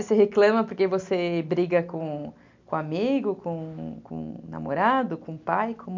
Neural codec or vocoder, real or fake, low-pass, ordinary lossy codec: none; real; 7.2 kHz; MP3, 64 kbps